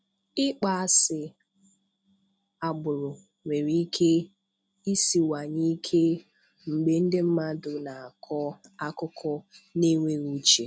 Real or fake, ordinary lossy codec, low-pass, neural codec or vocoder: real; none; none; none